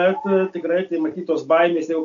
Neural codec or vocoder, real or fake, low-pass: none; real; 7.2 kHz